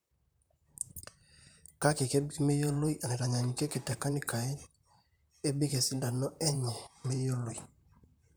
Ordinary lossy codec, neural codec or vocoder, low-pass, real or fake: none; vocoder, 44.1 kHz, 128 mel bands, Pupu-Vocoder; none; fake